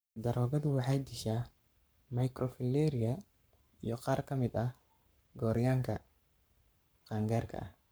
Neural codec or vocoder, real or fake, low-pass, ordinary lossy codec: codec, 44.1 kHz, 7.8 kbps, Pupu-Codec; fake; none; none